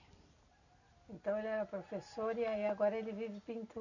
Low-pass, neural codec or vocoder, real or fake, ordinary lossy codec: 7.2 kHz; none; real; none